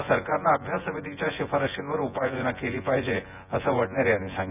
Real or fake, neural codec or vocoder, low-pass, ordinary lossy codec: fake; vocoder, 24 kHz, 100 mel bands, Vocos; 3.6 kHz; none